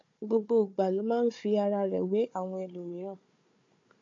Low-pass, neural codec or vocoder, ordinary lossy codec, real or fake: 7.2 kHz; codec, 16 kHz, 4 kbps, FunCodec, trained on Chinese and English, 50 frames a second; MP3, 48 kbps; fake